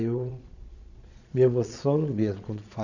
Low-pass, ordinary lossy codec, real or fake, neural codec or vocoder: 7.2 kHz; none; fake; vocoder, 22.05 kHz, 80 mel bands, Vocos